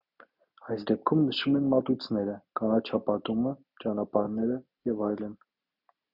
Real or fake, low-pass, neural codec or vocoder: real; 5.4 kHz; none